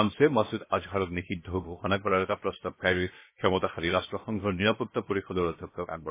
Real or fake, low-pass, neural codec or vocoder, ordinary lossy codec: fake; 3.6 kHz; codec, 16 kHz, 0.7 kbps, FocalCodec; MP3, 16 kbps